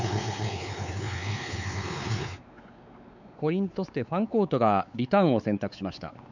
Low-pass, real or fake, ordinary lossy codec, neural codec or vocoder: 7.2 kHz; fake; none; codec, 16 kHz, 4 kbps, X-Codec, WavLM features, trained on Multilingual LibriSpeech